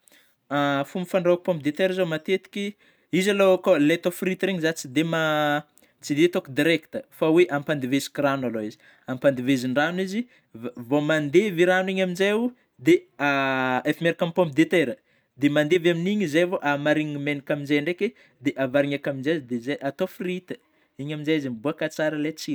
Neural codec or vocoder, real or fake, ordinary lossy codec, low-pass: none; real; none; none